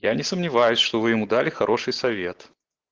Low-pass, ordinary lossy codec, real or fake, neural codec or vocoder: 7.2 kHz; Opus, 16 kbps; real; none